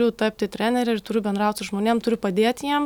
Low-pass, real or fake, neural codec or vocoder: 19.8 kHz; real; none